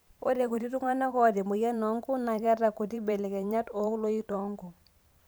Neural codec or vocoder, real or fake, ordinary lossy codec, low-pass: vocoder, 44.1 kHz, 128 mel bands every 512 samples, BigVGAN v2; fake; none; none